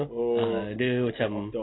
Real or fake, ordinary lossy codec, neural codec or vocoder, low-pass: real; AAC, 16 kbps; none; 7.2 kHz